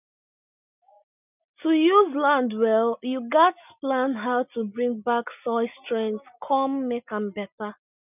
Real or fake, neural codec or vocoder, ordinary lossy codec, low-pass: real; none; none; 3.6 kHz